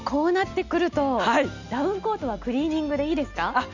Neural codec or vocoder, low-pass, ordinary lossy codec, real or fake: none; 7.2 kHz; none; real